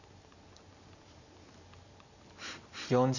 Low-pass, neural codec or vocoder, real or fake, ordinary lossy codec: 7.2 kHz; none; real; none